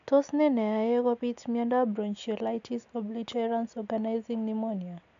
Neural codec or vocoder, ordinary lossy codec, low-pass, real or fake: none; AAC, 64 kbps; 7.2 kHz; real